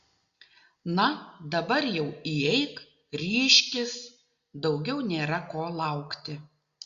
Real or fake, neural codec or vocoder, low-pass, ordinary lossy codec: real; none; 7.2 kHz; Opus, 64 kbps